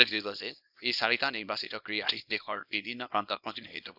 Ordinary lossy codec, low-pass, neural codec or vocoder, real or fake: AAC, 48 kbps; 5.4 kHz; codec, 24 kHz, 0.9 kbps, WavTokenizer, small release; fake